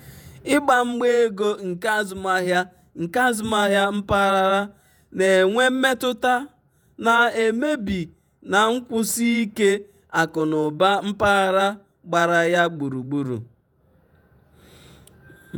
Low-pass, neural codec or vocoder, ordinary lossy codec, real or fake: none; vocoder, 48 kHz, 128 mel bands, Vocos; none; fake